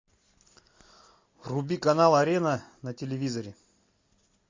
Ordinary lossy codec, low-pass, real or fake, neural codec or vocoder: MP3, 48 kbps; 7.2 kHz; real; none